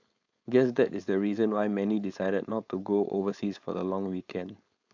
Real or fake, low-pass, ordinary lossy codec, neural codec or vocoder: fake; 7.2 kHz; AAC, 48 kbps; codec, 16 kHz, 4.8 kbps, FACodec